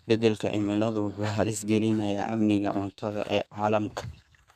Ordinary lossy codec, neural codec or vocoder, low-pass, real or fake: none; codec, 32 kHz, 1.9 kbps, SNAC; 14.4 kHz; fake